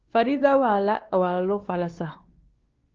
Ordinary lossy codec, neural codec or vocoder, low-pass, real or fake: Opus, 16 kbps; codec, 16 kHz, 2 kbps, X-Codec, WavLM features, trained on Multilingual LibriSpeech; 7.2 kHz; fake